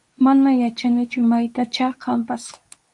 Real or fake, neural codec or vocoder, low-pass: fake; codec, 24 kHz, 0.9 kbps, WavTokenizer, medium speech release version 2; 10.8 kHz